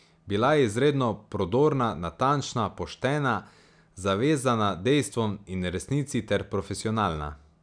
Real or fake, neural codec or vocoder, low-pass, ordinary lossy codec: real; none; 9.9 kHz; none